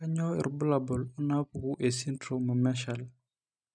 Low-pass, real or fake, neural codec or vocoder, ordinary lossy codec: 9.9 kHz; real; none; none